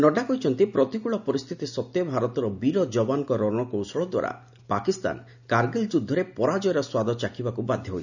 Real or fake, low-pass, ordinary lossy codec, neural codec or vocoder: real; 7.2 kHz; none; none